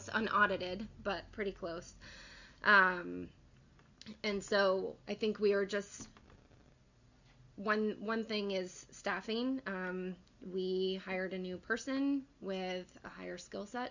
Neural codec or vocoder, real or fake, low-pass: none; real; 7.2 kHz